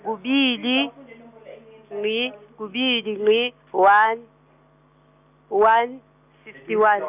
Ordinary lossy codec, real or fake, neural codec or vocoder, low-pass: none; real; none; 3.6 kHz